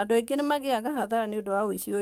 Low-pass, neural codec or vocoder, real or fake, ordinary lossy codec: 19.8 kHz; codec, 44.1 kHz, 7.8 kbps, DAC; fake; none